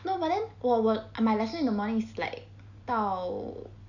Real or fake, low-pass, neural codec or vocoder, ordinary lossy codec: real; 7.2 kHz; none; none